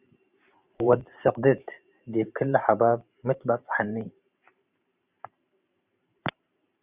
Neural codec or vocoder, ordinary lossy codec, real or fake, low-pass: vocoder, 44.1 kHz, 128 mel bands every 512 samples, BigVGAN v2; Opus, 64 kbps; fake; 3.6 kHz